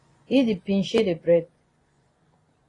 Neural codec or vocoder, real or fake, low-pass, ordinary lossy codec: vocoder, 24 kHz, 100 mel bands, Vocos; fake; 10.8 kHz; AAC, 32 kbps